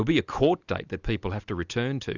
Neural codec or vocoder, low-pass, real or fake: none; 7.2 kHz; real